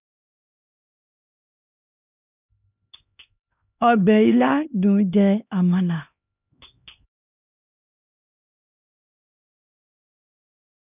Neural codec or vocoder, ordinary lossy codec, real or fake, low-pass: codec, 16 kHz, 2 kbps, X-Codec, HuBERT features, trained on LibriSpeech; none; fake; 3.6 kHz